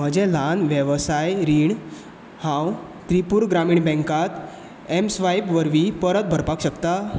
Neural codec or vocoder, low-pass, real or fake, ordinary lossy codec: none; none; real; none